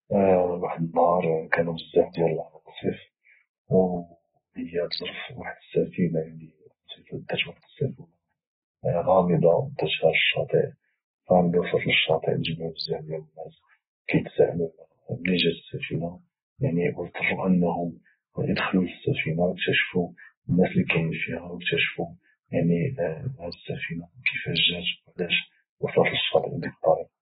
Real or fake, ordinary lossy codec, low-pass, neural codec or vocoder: real; AAC, 16 kbps; 7.2 kHz; none